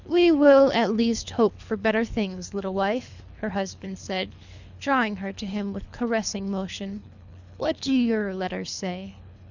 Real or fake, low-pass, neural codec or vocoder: fake; 7.2 kHz; codec, 24 kHz, 3 kbps, HILCodec